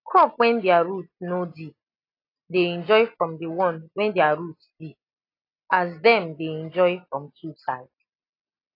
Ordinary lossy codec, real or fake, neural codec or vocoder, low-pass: AAC, 32 kbps; real; none; 5.4 kHz